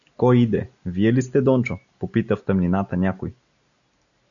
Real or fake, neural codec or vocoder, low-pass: real; none; 7.2 kHz